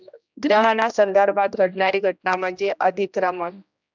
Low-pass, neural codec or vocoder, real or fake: 7.2 kHz; codec, 16 kHz, 1 kbps, X-Codec, HuBERT features, trained on general audio; fake